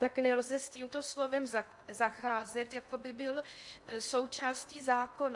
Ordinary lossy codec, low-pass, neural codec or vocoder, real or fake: AAC, 64 kbps; 10.8 kHz; codec, 16 kHz in and 24 kHz out, 0.8 kbps, FocalCodec, streaming, 65536 codes; fake